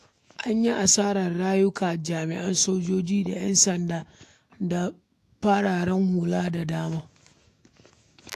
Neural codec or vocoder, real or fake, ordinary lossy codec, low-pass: autoencoder, 48 kHz, 128 numbers a frame, DAC-VAE, trained on Japanese speech; fake; AAC, 64 kbps; 14.4 kHz